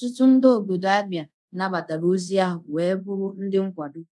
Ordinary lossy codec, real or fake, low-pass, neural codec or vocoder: none; fake; none; codec, 24 kHz, 0.5 kbps, DualCodec